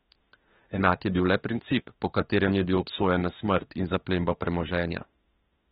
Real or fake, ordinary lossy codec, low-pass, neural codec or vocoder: fake; AAC, 16 kbps; 19.8 kHz; autoencoder, 48 kHz, 32 numbers a frame, DAC-VAE, trained on Japanese speech